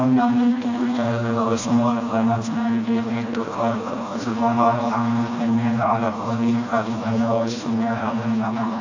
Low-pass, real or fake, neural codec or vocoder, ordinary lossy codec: 7.2 kHz; fake; codec, 16 kHz, 1 kbps, FreqCodec, smaller model; AAC, 48 kbps